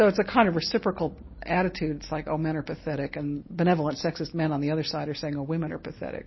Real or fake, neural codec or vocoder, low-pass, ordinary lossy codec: real; none; 7.2 kHz; MP3, 24 kbps